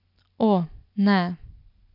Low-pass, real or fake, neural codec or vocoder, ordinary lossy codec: 5.4 kHz; real; none; none